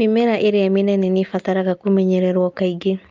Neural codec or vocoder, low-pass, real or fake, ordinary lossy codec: none; 7.2 kHz; real; Opus, 24 kbps